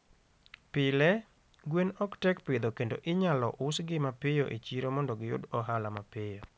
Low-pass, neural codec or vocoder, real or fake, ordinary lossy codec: none; none; real; none